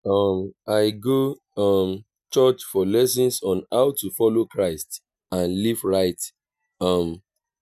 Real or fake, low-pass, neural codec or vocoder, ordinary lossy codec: real; 14.4 kHz; none; none